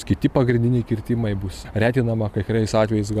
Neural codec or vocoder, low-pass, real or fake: none; 14.4 kHz; real